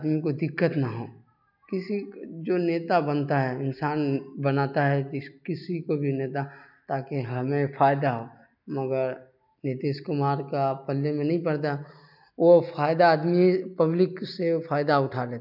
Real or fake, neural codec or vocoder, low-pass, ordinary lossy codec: real; none; 5.4 kHz; none